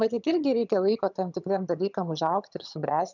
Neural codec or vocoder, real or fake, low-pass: vocoder, 22.05 kHz, 80 mel bands, HiFi-GAN; fake; 7.2 kHz